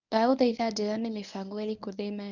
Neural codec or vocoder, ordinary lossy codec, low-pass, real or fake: codec, 24 kHz, 0.9 kbps, WavTokenizer, medium speech release version 1; none; 7.2 kHz; fake